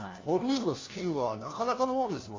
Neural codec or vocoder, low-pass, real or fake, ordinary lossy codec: codec, 16 kHz, 1 kbps, FunCodec, trained on LibriTTS, 50 frames a second; 7.2 kHz; fake; AAC, 32 kbps